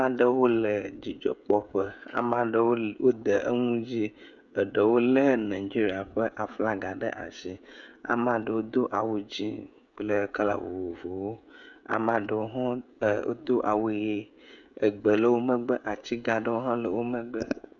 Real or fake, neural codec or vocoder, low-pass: fake; codec, 16 kHz, 16 kbps, FreqCodec, smaller model; 7.2 kHz